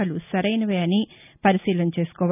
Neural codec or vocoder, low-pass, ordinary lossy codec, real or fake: none; 3.6 kHz; none; real